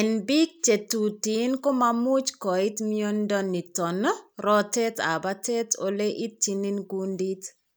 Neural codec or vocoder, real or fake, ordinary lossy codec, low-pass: none; real; none; none